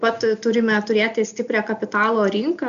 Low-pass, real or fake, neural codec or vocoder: 7.2 kHz; real; none